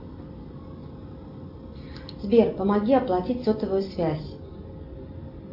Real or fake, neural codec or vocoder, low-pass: real; none; 5.4 kHz